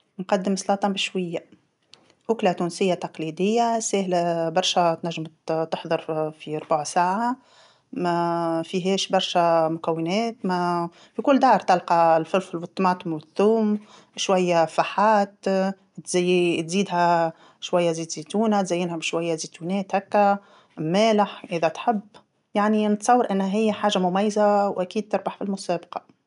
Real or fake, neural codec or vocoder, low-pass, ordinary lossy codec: real; none; 10.8 kHz; none